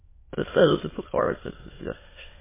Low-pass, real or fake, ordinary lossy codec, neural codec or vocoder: 3.6 kHz; fake; MP3, 16 kbps; autoencoder, 22.05 kHz, a latent of 192 numbers a frame, VITS, trained on many speakers